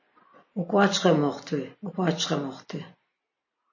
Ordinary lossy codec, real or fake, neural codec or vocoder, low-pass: MP3, 32 kbps; real; none; 7.2 kHz